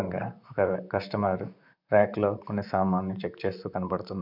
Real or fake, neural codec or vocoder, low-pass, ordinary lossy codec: fake; vocoder, 44.1 kHz, 128 mel bands, Pupu-Vocoder; 5.4 kHz; none